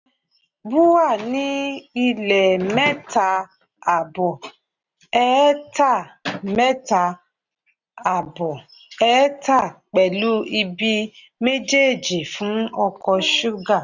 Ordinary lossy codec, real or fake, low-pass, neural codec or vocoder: none; real; 7.2 kHz; none